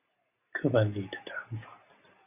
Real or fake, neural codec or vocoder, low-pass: real; none; 3.6 kHz